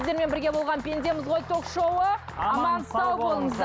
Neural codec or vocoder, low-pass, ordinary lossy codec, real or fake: none; none; none; real